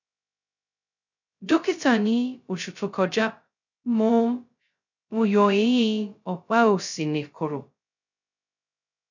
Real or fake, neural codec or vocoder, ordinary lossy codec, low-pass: fake; codec, 16 kHz, 0.2 kbps, FocalCodec; none; 7.2 kHz